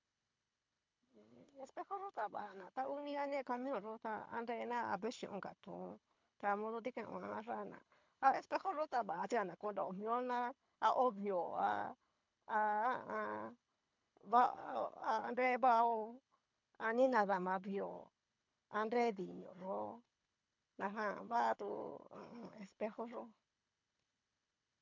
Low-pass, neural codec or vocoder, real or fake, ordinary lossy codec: 7.2 kHz; codec, 24 kHz, 6 kbps, HILCodec; fake; MP3, 64 kbps